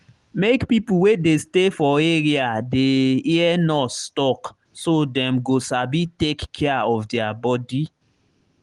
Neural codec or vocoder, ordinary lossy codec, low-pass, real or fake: none; Opus, 24 kbps; 10.8 kHz; real